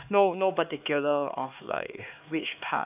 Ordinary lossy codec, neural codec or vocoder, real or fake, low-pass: none; codec, 16 kHz, 2 kbps, X-Codec, HuBERT features, trained on LibriSpeech; fake; 3.6 kHz